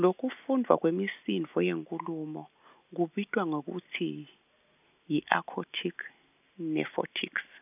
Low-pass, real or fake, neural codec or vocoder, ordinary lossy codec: 3.6 kHz; real; none; none